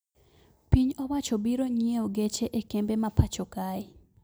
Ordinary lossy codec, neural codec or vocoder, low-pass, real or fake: none; none; none; real